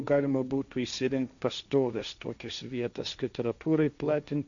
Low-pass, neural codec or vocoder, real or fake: 7.2 kHz; codec, 16 kHz, 1.1 kbps, Voila-Tokenizer; fake